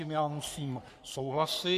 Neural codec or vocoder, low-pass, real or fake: codec, 44.1 kHz, 3.4 kbps, Pupu-Codec; 14.4 kHz; fake